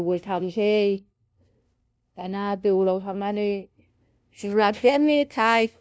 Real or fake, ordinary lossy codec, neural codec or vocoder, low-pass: fake; none; codec, 16 kHz, 0.5 kbps, FunCodec, trained on LibriTTS, 25 frames a second; none